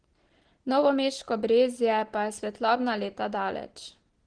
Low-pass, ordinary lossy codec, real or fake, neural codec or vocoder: 9.9 kHz; Opus, 16 kbps; real; none